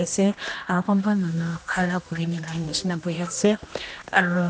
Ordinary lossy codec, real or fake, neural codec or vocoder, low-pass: none; fake; codec, 16 kHz, 1 kbps, X-Codec, HuBERT features, trained on general audio; none